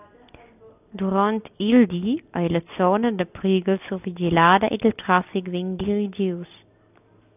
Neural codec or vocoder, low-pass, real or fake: none; 3.6 kHz; real